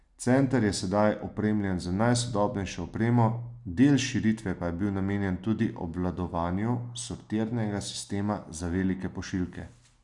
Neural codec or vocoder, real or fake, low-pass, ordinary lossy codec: none; real; 10.8 kHz; none